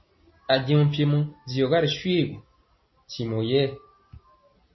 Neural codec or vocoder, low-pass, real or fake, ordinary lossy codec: none; 7.2 kHz; real; MP3, 24 kbps